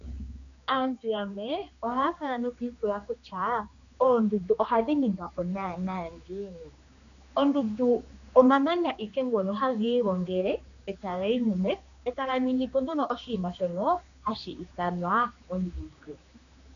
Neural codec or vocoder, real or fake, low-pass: codec, 16 kHz, 2 kbps, X-Codec, HuBERT features, trained on general audio; fake; 7.2 kHz